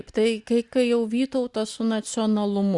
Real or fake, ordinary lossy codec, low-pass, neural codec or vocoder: real; Opus, 64 kbps; 10.8 kHz; none